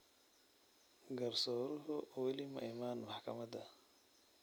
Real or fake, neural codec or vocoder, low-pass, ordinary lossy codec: real; none; none; none